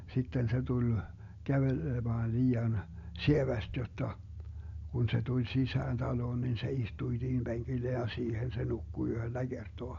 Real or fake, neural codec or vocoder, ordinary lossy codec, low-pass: real; none; AAC, 48 kbps; 7.2 kHz